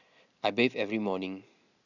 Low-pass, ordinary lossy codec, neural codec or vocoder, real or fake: 7.2 kHz; none; none; real